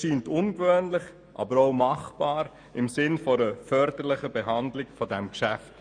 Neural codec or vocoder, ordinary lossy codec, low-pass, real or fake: autoencoder, 48 kHz, 128 numbers a frame, DAC-VAE, trained on Japanese speech; MP3, 96 kbps; 9.9 kHz; fake